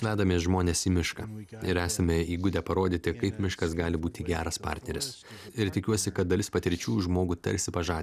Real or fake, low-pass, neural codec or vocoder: real; 14.4 kHz; none